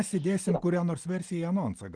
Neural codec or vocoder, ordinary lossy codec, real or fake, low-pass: none; Opus, 24 kbps; real; 9.9 kHz